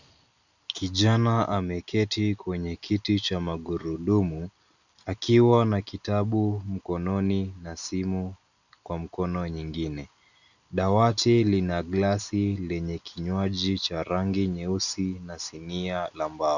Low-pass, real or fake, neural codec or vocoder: 7.2 kHz; real; none